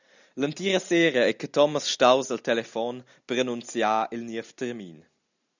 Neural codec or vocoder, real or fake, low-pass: none; real; 7.2 kHz